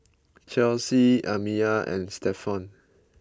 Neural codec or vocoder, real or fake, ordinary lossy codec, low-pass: none; real; none; none